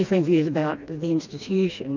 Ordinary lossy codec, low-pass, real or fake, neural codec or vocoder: MP3, 48 kbps; 7.2 kHz; fake; codec, 16 kHz, 2 kbps, FreqCodec, smaller model